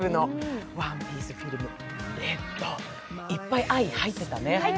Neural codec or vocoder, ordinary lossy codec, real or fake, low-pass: none; none; real; none